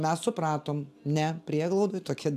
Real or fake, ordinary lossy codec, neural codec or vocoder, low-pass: real; AAC, 96 kbps; none; 14.4 kHz